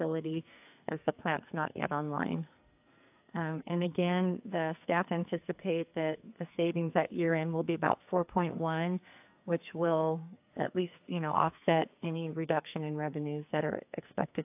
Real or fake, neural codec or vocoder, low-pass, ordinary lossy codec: fake; codec, 44.1 kHz, 2.6 kbps, SNAC; 3.6 kHz; AAC, 32 kbps